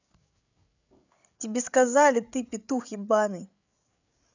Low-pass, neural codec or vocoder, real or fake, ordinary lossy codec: 7.2 kHz; codec, 16 kHz, 8 kbps, FreqCodec, larger model; fake; none